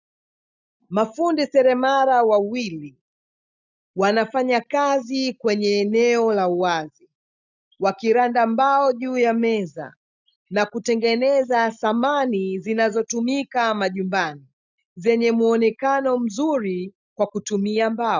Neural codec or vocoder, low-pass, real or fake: none; 7.2 kHz; real